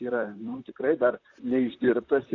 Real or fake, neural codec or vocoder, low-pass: fake; vocoder, 44.1 kHz, 128 mel bands, Pupu-Vocoder; 7.2 kHz